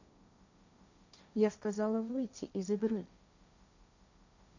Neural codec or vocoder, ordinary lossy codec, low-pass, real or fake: codec, 16 kHz, 1.1 kbps, Voila-Tokenizer; none; 7.2 kHz; fake